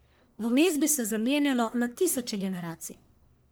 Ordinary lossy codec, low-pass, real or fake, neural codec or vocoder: none; none; fake; codec, 44.1 kHz, 1.7 kbps, Pupu-Codec